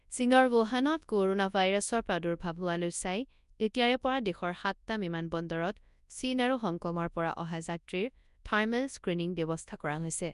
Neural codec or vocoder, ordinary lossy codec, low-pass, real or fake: codec, 24 kHz, 0.9 kbps, WavTokenizer, large speech release; none; 10.8 kHz; fake